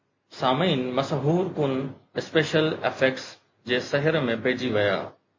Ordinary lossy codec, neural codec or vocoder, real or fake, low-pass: MP3, 32 kbps; none; real; 7.2 kHz